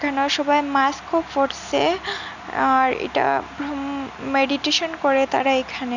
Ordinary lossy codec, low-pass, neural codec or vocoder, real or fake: none; 7.2 kHz; none; real